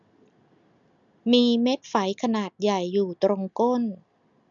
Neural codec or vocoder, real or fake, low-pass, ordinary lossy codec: none; real; 7.2 kHz; none